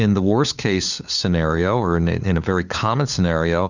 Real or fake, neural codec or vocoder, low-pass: fake; codec, 16 kHz, 4 kbps, FunCodec, trained on LibriTTS, 50 frames a second; 7.2 kHz